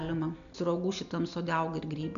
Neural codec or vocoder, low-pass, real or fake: none; 7.2 kHz; real